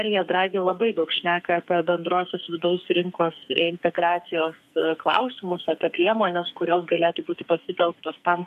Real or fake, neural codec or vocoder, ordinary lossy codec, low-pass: fake; codec, 44.1 kHz, 2.6 kbps, SNAC; MP3, 96 kbps; 14.4 kHz